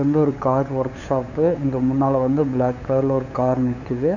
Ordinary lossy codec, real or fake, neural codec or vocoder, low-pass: none; fake; codec, 16 kHz, 8 kbps, FunCodec, trained on LibriTTS, 25 frames a second; 7.2 kHz